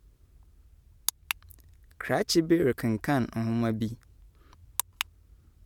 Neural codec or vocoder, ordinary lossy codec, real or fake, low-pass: vocoder, 44.1 kHz, 128 mel bands every 512 samples, BigVGAN v2; none; fake; 19.8 kHz